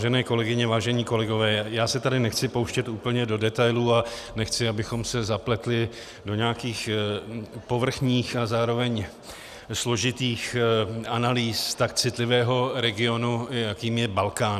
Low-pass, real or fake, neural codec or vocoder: 14.4 kHz; real; none